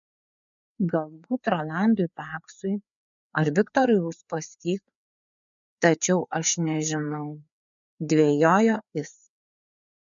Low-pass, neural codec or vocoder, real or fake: 7.2 kHz; codec, 16 kHz, 4 kbps, FreqCodec, larger model; fake